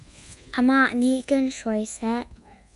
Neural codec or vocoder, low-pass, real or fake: codec, 24 kHz, 1.2 kbps, DualCodec; 10.8 kHz; fake